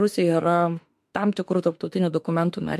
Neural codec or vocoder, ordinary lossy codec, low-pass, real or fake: autoencoder, 48 kHz, 32 numbers a frame, DAC-VAE, trained on Japanese speech; MP3, 64 kbps; 14.4 kHz; fake